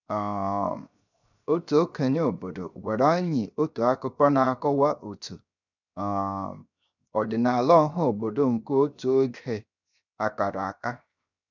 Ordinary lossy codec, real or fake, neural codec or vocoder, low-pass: none; fake; codec, 16 kHz, 0.7 kbps, FocalCodec; 7.2 kHz